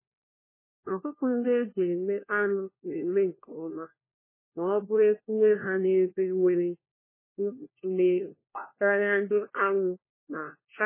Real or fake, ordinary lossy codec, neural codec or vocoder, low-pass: fake; MP3, 16 kbps; codec, 16 kHz, 1 kbps, FunCodec, trained on LibriTTS, 50 frames a second; 3.6 kHz